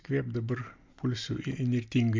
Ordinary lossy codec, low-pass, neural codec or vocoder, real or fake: MP3, 64 kbps; 7.2 kHz; none; real